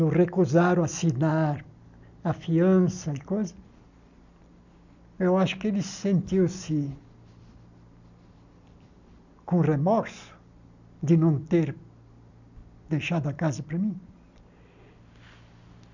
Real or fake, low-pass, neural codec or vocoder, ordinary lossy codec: real; 7.2 kHz; none; none